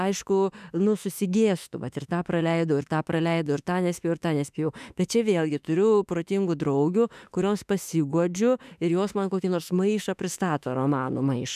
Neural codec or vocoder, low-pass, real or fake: autoencoder, 48 kHz, 32 numbers a frame, DAC-VAE, trained on Japanese speech; 14.4 kHz; fake